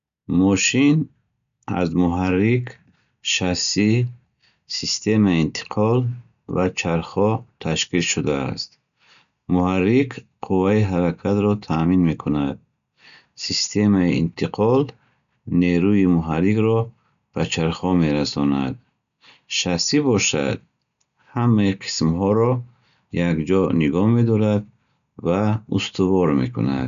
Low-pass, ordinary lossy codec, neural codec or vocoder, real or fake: 7.2 kHz; none; none; real